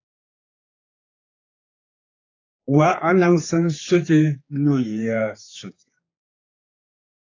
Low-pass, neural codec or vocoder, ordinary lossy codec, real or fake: 7.2 kHz; codec, 16 kHz, 4 kbps, X-Codec, HuBERT features, trained on general audio; AAC, 32 kbps; fake